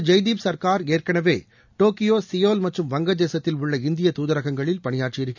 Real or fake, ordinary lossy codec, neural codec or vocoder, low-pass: real; none; none; 7.2 kHz